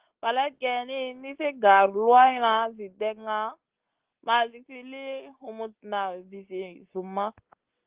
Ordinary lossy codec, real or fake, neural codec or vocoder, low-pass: Opus, 16 kbps; real; none; 3.6 kHz